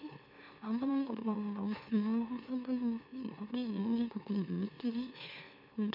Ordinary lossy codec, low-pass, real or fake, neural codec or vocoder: none; 5.4 kHz; fake; autoencoder, 44.1 kHz, a latent of 192 numbers a frame, MeloTTS